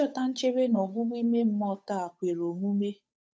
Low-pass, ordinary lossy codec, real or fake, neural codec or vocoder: none; none; fake; codec, 16 kHz, 8 kbps, FunCodec, trained on Chinese and English, 25 frames a second